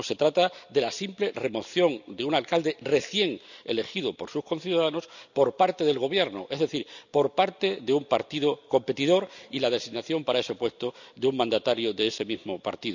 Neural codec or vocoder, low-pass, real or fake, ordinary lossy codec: none; 7.2 kHz; real; none